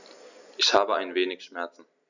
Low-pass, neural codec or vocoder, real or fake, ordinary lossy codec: 7.2 kHz; none; real; none